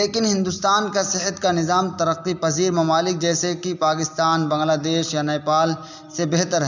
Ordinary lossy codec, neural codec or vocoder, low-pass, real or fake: none; none; 7.2 kHz; real